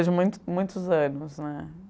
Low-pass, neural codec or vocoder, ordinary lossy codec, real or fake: none; none; none; real